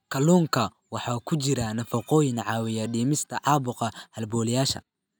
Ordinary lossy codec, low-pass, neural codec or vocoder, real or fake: none; none; none; real